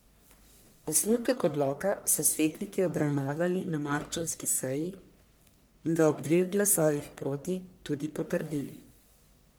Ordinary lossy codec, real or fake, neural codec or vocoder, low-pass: none; fake; codec, 44.1 kHz, 1.7 kbps, Pupu-Codec; none